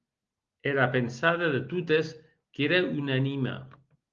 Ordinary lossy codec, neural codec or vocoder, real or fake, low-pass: Opus, 32 kbps; none; real; 7.2 kHz